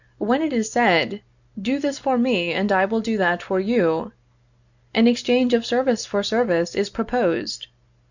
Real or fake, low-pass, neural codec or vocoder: real; 7.2 kHz; none